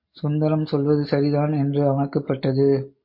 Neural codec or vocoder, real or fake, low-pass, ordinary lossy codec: none; real; 5.4 kHz; MP3, 32 kbps